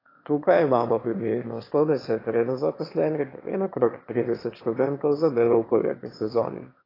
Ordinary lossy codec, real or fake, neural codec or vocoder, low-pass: AAC, 24 kbps; fake; autoencoder, 22.05 kHz, a latent of 192 numbers a frame, VITS, trained on one speaker; 5.4 kHz